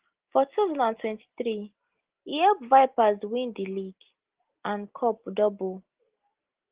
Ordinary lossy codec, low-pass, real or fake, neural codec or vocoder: Opus, 16 kbps; 3.6 kHz; real; none